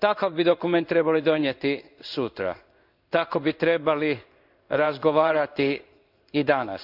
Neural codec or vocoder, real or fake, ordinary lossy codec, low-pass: codec, 16 kHz in and 24 kHz out, 1 kbps, XY-Tokenizer; fake; none; 5.4 kHz